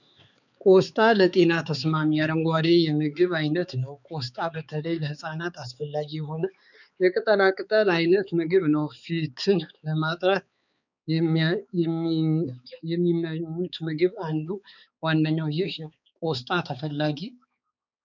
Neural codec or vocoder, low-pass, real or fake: codec, 16 kHz, 4 kbps, X-Codec, HuBERT features, trained on balanced general audio; 7.2 kHz; fake